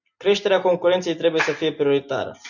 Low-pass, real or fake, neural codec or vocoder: 7.2 kHz; real; none